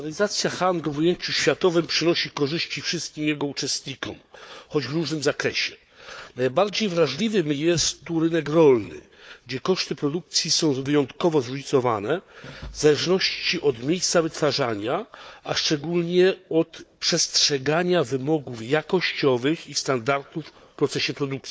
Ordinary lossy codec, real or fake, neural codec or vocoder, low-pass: none; fake; codec, 16 kHz, 4 kbps, FunCodec, trained on Chinese and English, 50 frames a second; none